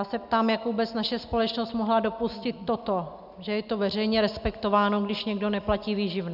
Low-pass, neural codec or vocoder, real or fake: 5.4 kHz; none; real